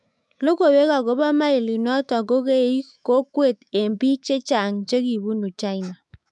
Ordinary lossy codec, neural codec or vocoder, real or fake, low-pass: MP3, 96 kbps; autoencoder, 48 kHz, 128 numbers a frame, DAC-VAE, trained on Japanese speech; fake; 10.8 kHz